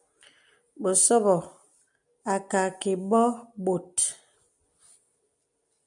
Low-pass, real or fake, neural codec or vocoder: 10.8 kHz; real; none